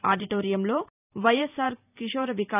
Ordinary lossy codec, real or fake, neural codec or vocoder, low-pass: none; fake; vocoder, 44.1 kHz, 128 mel bands every 512 samples, BigVGAN v2; 3.6 kHz